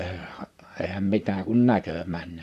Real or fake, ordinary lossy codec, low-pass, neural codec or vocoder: fake; none; 14.4 kHz; vocoder, 44.1 kHz, 128 mel bands, Pupu-Vocoder